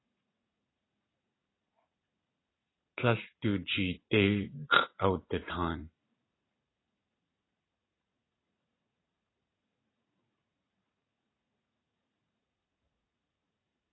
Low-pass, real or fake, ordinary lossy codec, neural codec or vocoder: 7.2 kHz; fake; AAC, 16 kbps; vocoder, 44.1 kHz, 80 mel bands, Vocos